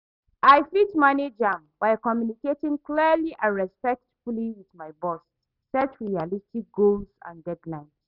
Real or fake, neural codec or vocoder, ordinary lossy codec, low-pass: real; none; none; 5.4 kHz